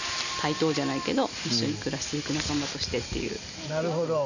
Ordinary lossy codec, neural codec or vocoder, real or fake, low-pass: none; none; real; 7.2 kHz